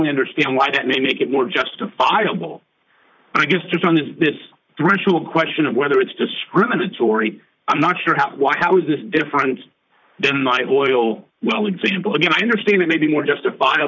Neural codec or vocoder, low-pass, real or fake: vocoder, 44.1 kHz, 128 mel bands, Pupu-Vocoder; 7.2 kHz; fake